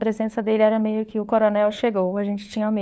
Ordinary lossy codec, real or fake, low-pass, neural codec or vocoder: none; fake; none; codec, 16 kHz, 4 kbps, FunCodec, trained on LibriTTS, 50 frames a second